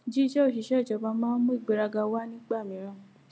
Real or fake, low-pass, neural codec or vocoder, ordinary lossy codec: real; none; none; none